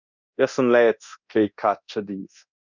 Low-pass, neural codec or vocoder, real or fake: 7.2 kHz; codec, 24 kHz, 0.9 kbps, DualCodec; fake